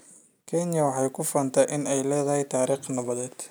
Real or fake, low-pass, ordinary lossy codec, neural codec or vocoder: real; none; none; none